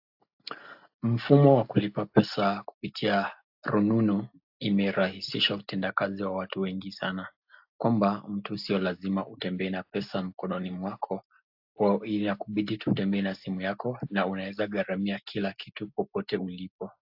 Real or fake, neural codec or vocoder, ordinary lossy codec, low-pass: real; none; AAC, 48 kbps; 5.4 kHz